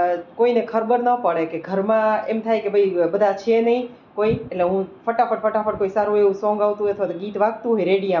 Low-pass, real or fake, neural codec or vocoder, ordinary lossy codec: 7.2 kHz; real; none; none